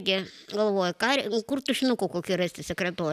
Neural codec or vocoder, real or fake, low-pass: none; real; 14.4 kHz